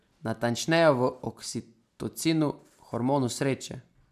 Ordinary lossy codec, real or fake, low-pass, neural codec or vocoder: none; real; 14.4 kHz; none